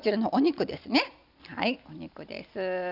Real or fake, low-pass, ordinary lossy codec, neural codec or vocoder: real; 5.4 kHz; none; none